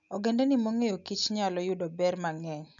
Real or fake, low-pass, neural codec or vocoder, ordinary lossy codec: real; 7.2 kHz; none; none